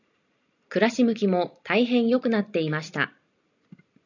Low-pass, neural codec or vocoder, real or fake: 7.2 kHz; none; real